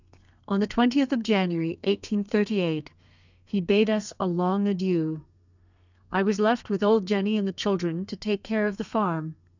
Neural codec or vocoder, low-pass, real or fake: codec, 32 kHz, 1.9 kbps, SNAC; 7.2 kHz; fake